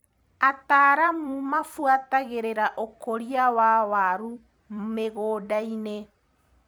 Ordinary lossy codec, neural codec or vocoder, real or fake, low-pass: none; vocoder, 44.1 kHz, 128 mel bands every 256 samples, BigVGAN v2; fake; none